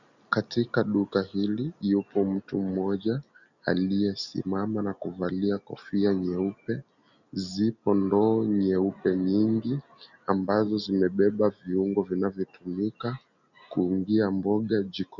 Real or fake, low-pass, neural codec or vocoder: real; 7.2 kHz; none